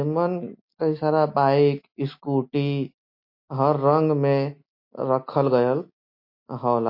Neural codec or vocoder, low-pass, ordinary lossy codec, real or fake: none; 5.4 kHz; MP3, 32 kbps; real